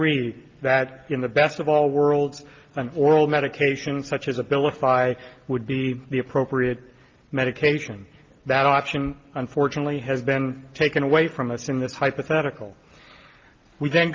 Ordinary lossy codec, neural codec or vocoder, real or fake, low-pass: Opus, 32 kbps; none; real; 7.2 kHz